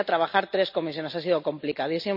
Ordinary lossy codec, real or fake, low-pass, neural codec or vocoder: none; real; 5.4 kHz; none